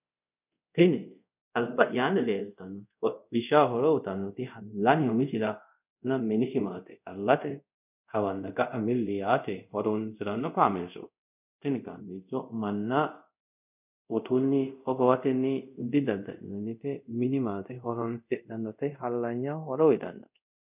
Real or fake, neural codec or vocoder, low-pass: fake; codec, 24 kHz, 0.5 kbps, DualCodec; 3.6 kHz